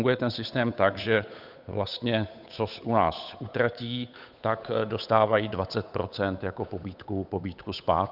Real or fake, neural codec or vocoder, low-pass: fake; codec, 16 kHz, 8 kbps, FunCodec, trained on Chinese and English, 25 frames a second; 5.4 kHz